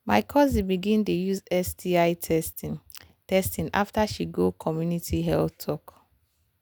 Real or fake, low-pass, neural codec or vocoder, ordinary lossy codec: real; none; none; none